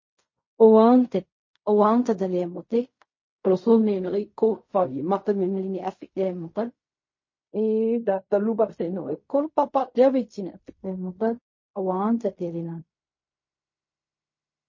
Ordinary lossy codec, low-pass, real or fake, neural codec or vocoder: MP3, 32 kbps; 7.2 kHz; fake; codec, 16 kHz in and 24 kHz out, 0.4 kbps, LongCat-Audio-Codec, fine tuned four codebook decoder